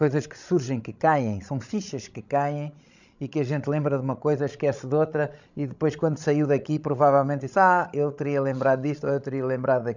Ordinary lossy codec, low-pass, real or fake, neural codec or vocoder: none; 7.2 kHz; fake; codec, 16 kHz, 16 kbps, FreqCodec, larger model